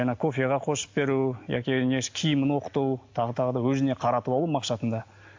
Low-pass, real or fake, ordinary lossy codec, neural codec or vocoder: 7.2 kHz; real; MP3, 48 kbps; none